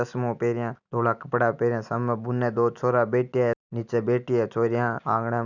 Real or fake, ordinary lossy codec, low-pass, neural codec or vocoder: real; none; 7.2 kHz; none